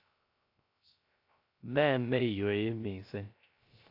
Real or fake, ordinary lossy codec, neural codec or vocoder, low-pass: fake; AAC, 32 kbps; codec, 16 kHz, 0.3 kbps, FocalCodec; 5.4 kHz